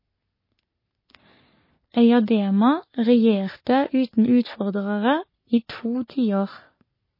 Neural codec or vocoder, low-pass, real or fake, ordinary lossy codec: codec, 44.1 kHz, 3.4 kbps, Pupu-Codec; 5.4 kHz; fake; MP3, 24 kbps